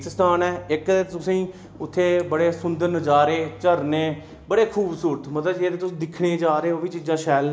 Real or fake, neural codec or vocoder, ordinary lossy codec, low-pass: real; none; none; none